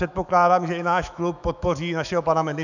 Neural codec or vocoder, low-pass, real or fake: autoencoder, 48 kHz, 128 numbers a frame, DAC-VAE, trained on Japanese speech; 7.2 kHz; fake